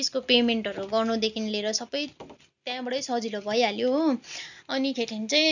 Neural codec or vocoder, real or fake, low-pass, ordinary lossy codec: none; real; 7.2 kHz; none